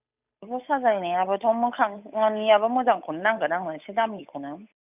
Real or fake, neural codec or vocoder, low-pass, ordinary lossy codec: fake; codec, 16 kHz, 8 kbps, FunCodec, trained on Chinese and English, 25 frames a second; 3.6 kHz; none